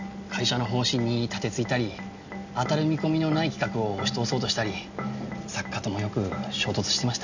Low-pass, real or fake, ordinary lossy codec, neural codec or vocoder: 7.2 kHz; real; none; none